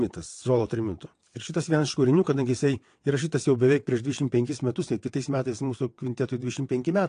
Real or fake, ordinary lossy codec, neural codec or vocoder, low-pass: fake; AAC, 48 kbps; vocoder, 22.05 kHz, 80 mel bands, WaveNeXt; 9.9 kHz